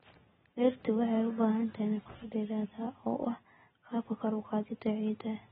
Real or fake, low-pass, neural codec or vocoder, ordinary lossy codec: real; 7.2 kHz; none; AAC, 16 kbps